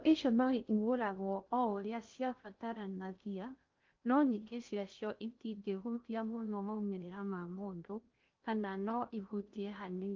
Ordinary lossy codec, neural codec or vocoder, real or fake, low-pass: Opus, 32 kbps; codec, 16 kHz in and 24 kHz out, 0.6 kbps, FocalCodec, streaming, 2048 codes; fake; 7.2 kHz